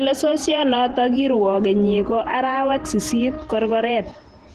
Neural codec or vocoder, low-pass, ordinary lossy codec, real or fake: vocoder, 48 kHz, 128 mel bands, Vocos; 14.4 kHz; Opus, 16 kbps; fake